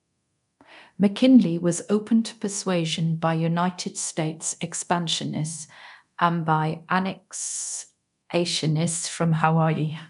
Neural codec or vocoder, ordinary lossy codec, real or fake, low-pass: codec, 24 kHz, 0.9 kbps, DualCodec; none; fake; 10.8 kHz